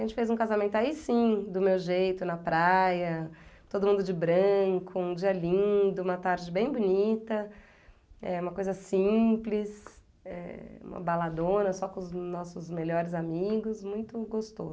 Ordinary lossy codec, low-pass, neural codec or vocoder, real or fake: none; none; none; real